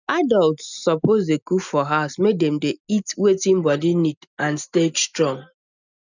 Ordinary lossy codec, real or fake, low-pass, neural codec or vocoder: none; real; 7.2 kHz; none